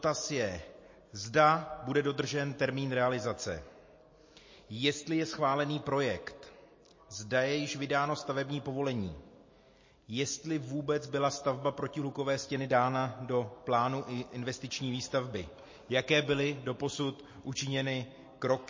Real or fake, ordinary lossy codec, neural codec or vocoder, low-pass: real; MP3, 32 kbps; none; 7.2 kHz